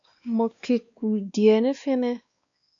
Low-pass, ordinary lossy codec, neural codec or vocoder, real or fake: 7.2 kHz; AAC, 64 kbps; codec, 16 kHz, 2 kbps, X-Codec, WavLM features, trained on Multilingual LibriSpeech; fake